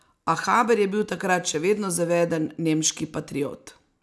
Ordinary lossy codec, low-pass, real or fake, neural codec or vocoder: none; none; real; none